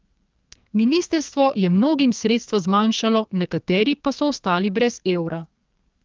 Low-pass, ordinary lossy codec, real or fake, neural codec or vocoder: 7.2 kHz; Opus, 32 kbps; fake; codec, 44.1 kHz, 2.6 kbps, SNAC